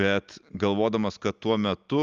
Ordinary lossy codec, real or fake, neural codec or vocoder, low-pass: Opus, 32 kbps; real; none; 7.2 kHz